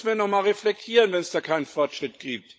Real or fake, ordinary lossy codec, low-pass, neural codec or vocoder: fake; none; none; codec, 16 kHz, 16 kbps, FunCodec, trained on LibriTTS, 50 frames a second